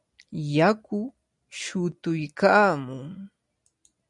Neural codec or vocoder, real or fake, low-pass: none; real; 10.8 kHz